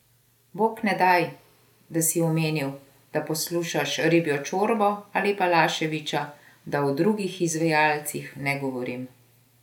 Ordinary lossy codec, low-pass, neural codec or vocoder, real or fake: none; 19.8 kHz; none; real